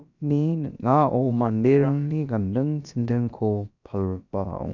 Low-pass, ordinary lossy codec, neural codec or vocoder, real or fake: 7.2 kHz; none; codec, 16 kHz, about 1 kbps, DyCAST, with the encoder's durations; fake